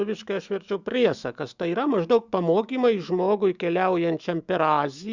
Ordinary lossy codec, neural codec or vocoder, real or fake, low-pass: Opus, 64 kbps; autoencoder, 48 kHz, 128 numbers a frame, DAC-VAE, trained on Japanese speech; fake; 7.2 kHz